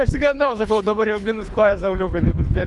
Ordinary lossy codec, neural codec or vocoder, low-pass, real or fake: MP3, 96 kbps; codec, 24 kHz, 3 kbps, HILCodec; 10.8 kHz; fake